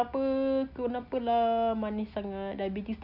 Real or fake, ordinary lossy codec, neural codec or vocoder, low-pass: real; none; none; 5.4 kHz